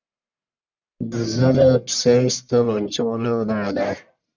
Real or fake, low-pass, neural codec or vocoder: fake; 7.2 kHz; codec, 44.1 kHz, 1.7 kbps, Pupu-Codec